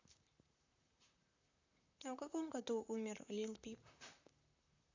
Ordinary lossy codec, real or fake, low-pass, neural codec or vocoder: AAC, 48 kbps; fake; 7.2 kHz; vocoder, 44.1 kHz, 128 mel bands, Pupu-Vocoder